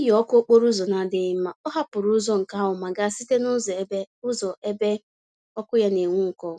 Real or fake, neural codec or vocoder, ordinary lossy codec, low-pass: real; none; none; none